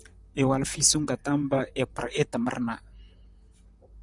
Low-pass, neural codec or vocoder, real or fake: 10.8 kHz; vocoder, 44.1 kHz, 128 mel bands, Pupu-Vocoder; fake